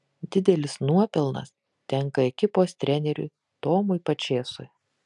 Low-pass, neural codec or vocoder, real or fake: 10.8 kHz; none; real